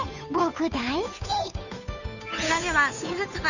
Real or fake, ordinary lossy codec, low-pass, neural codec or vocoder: fake; none; 7.2 kHz; codec, 16 kHz, 2 kbps, FunCodec, trained on Chinese and English, 25 frames a second